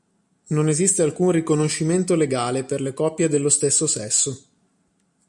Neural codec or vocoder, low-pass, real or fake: none; 10.8 kHz; real